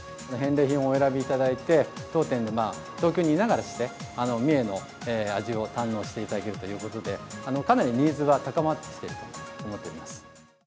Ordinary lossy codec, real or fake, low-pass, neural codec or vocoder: none; real; none; none